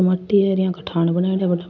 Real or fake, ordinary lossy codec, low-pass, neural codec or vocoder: real; none; 7.2 kHz; none